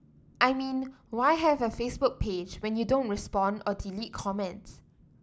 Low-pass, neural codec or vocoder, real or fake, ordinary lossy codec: none; none; real; none